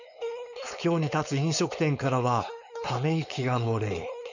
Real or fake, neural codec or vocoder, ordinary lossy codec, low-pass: fake; codec, 16 kHz, 4.8 kbps, FACodec; none; 7.2 kHz